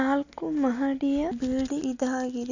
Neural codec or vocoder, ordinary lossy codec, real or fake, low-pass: none; none; real; 7.2 kHz